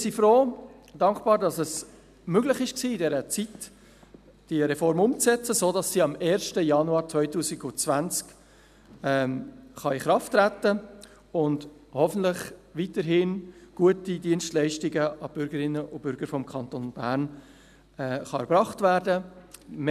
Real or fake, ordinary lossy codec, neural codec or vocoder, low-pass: real; none; none; 14.4 kHz